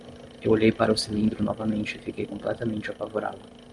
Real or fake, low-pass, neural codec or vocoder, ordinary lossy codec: real; 10.8 kHz; none; Opus, 24 kbps